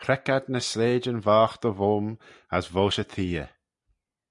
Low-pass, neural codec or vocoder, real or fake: 10.8 kHz; none; real